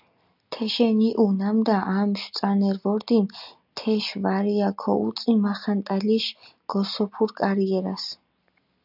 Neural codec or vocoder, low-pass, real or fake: none; 5.4 kHz; real